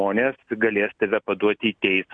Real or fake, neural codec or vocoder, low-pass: real; none; 9.9 kHz